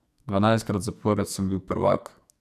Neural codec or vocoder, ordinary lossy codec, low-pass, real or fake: codec, 44.1 kHz, 2.6 kbps, SNAC; none; 14.4 kHz; fake